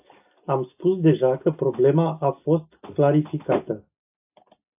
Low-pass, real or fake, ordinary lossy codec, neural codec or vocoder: 3.6 kHz; real; AAC, 32 kbps; none